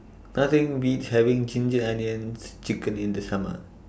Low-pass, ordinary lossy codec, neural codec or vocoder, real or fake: none; none; none; real